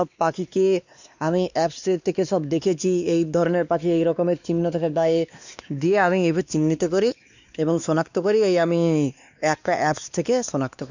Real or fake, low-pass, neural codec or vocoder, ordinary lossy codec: fake; 7.2 kHz; codec, 16 kHz, 2 kbps, X-Codec, WavLM features, trained on Multilingual LibriSpeech; none